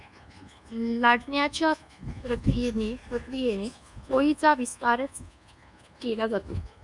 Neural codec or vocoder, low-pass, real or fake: codec, 24 kHz, 1.2 kbps, DualCodec; 10.8 kHz; fake